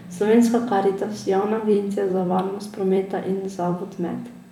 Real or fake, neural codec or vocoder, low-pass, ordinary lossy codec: fake; vocoder, 44.1 kHz, 128 mel bands every 256 samples, BigVGAN v2; 19.8 kHz; none